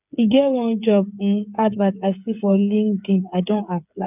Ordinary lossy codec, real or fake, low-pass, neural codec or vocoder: none; fake; 3.6 kHz; codec, 16 kHz, 8 kbps, FreqCodec, smaller model